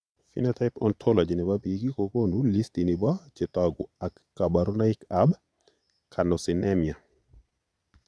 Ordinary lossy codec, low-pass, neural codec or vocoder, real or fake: none; none; vocoder, 22.05 kHz, 80 mel bands, Vocos; fake